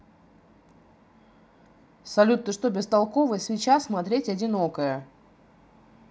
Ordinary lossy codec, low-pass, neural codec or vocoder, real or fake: none; none; none; real